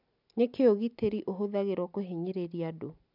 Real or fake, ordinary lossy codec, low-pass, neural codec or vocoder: real; none; 5.4 kHz; none